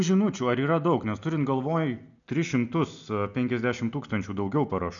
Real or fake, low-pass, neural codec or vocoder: real; 7.2 kHz; none